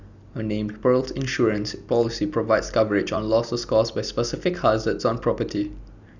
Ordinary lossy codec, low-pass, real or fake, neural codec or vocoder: none; 7.2 kHz; real; none